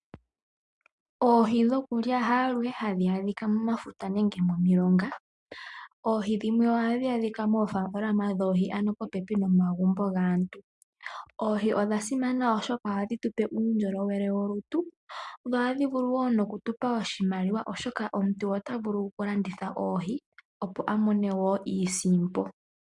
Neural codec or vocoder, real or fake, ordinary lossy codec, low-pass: none; real; MP3, 96 kbps; 10.8 kHz